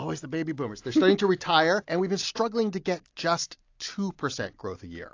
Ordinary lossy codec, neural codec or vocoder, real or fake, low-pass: AAC, 48 kbps; none; real; 7.2 kHz